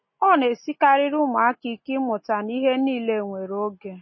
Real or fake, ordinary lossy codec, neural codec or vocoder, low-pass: real; MP3, 24 kbps; none; 7.2 kHz